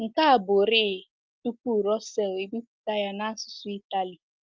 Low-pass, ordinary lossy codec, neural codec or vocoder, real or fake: 7.2 kHz; Opus, 24 kbps; none; real